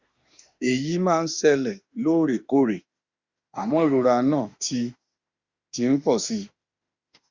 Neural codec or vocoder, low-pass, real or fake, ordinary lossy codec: autoencoder, 48 kHz, 32 numbers a frame, DAC-VAE, trained on Japanese speech; 7.2 kHz; fake; Opus, 64 kbps